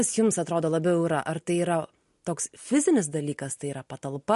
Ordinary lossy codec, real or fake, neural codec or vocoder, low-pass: MP3, 48 kbps; real; none; 14.4 kHz